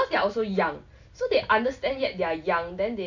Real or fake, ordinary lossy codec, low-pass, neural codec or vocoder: real; AAC, 48 kbps; 7.2 kHz; none